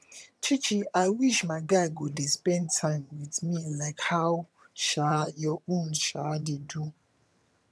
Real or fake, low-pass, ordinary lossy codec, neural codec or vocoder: fake; none; none; vocoder, 22.05 kHz, 80 mel bands, HiFi-GAN